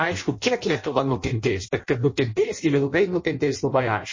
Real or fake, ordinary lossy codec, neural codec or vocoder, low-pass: fake; MP3, 32 kbps; codec, 16 kHz in and 24 kHz out, 0.6 kbps, FireRedTTS-2 codec; 7.2 kHz